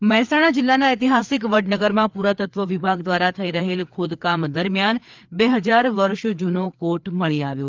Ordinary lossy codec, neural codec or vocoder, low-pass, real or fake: Opus, 32 kbps; codec, 16 kHz, 4 kbps, FreqCodec, larger model; 7.2 kHz; fake